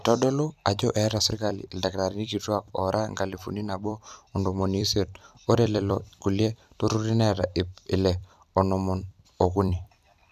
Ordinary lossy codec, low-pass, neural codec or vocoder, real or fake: none; 14.4 kHz; vocoder, 44.1 kHz, 128 mel bands every 256 samples, BigVGAN v2; fake